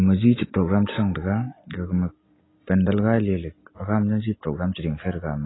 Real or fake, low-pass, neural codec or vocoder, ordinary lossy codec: real; 7.2 kHz; none; AAC, 16 kbps